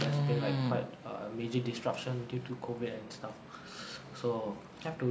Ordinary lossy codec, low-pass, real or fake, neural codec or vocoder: none; none; real; none